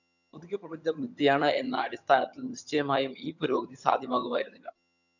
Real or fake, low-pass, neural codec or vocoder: fake; 7.2 kHz; vocoder, 22.05 kHz, 80 mel bands, HiFi-GAN